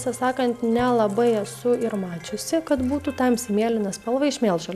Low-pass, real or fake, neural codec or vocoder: 14.4 kHz; real; none